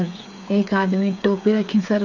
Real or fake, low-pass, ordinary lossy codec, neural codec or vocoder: fake; 7.2 kHz; none; codec, 16 kHz, 8 kbps, FreqCodec, smaller model